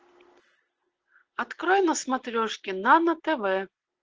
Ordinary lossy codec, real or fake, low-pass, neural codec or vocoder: Opus, 16 kbps; real; 7.2 kHz; none